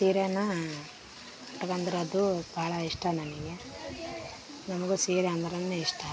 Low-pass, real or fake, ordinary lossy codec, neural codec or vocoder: none; real; none; none